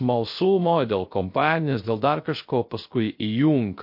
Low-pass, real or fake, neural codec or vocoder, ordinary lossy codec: 5.4 kHz; fake; codec, 16 kHz, 0.3 kbps, FocalCodec; MP3, 32 kbps